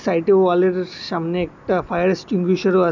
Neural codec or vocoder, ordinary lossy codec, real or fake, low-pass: none; none; real; 7.2 kHz